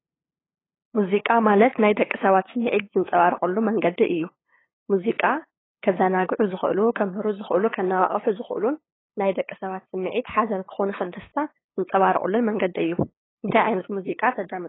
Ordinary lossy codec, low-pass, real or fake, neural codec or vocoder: AAC, 16 kbps; 7.2 kHz; fake; codec, 16 kHz, 8 kbps, FunCodec, trained on LibriTTS, 25 frames a second